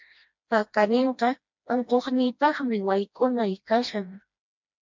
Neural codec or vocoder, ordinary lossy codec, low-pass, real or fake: codec, 16 kHz, 1 kbps, FreqCodec, smaller model; MP3, 64 kbps; 7.2 kHz; fake